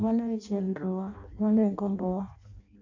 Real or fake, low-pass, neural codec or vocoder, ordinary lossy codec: fake; 7.2 kHz; codec, 16 kHz in and 24 kHz out, 0.6 kbps, FireRedTTS-2 codec; AAC, 48 kbps